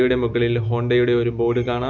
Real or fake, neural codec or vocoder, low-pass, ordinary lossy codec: real; none; 7.2 kHz; none